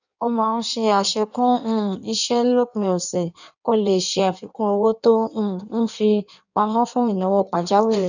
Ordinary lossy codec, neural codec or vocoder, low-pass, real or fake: none; codec, 16 kHz in and 24 kHz out, 1.1 kbps, FireRedTTS-2 codec; 7.2 kHz; fake